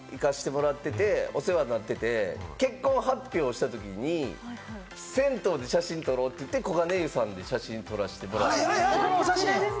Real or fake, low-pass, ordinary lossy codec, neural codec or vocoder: real; none; none; none